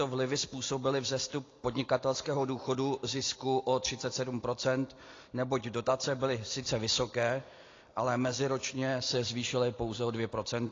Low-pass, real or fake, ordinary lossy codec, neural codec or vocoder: 7.2 kHz; real; AAC, 32 kbps; none